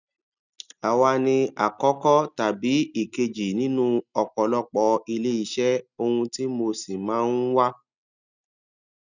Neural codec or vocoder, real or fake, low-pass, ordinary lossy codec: none; real; 7.2 kHz; none